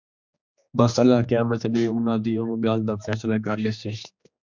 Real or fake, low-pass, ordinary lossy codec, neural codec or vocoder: fake; 7.2 kHz; MP3, 64 kbps; codec, 16 kHz, 2 kbps, X-Codec, HuBERT features, trained on general audio